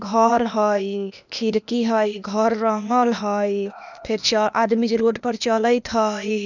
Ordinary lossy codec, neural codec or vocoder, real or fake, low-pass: none; codec, 16 kHz, 0.8 kbps, ZipCodec; fake; 7.2 kHz